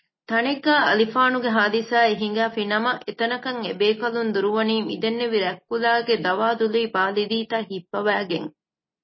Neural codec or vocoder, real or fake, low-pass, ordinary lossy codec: none; real; 7.2 kHz; MP3, 24 kbps